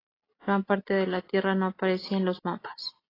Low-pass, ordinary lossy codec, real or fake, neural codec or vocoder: 5.4 kHz; AAC, 24 kbps; real; none